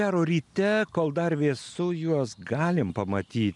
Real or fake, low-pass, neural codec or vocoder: real; 10.8 kHz; none